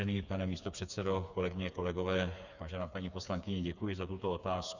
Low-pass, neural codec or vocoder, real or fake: 7.2 kHz; codec, 16 kHz, 4 kbps, FreqCodec, smaller model; fake